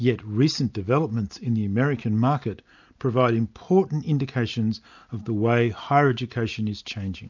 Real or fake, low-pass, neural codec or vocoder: real; 7.2 kHz; none